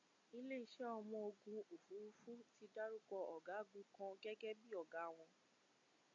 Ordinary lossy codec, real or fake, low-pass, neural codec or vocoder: MP3, 48 kbps; real; 7.2 kHz; none